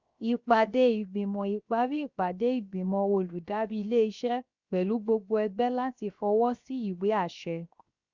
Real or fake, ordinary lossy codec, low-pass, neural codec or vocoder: fake; Opus, 64 kbps; 7.2 kHz; codec, 16 kHz, 0.7 kbps, FocalCodec